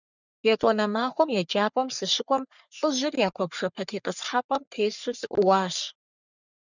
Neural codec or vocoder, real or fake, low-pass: codec, 44.1 kHz, 3.4 kbps, Pupu-Codec; fake; 7.2 kHz